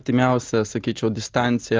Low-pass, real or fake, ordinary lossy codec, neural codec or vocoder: 7.2 kHz; real; Opus, 24 kbps; none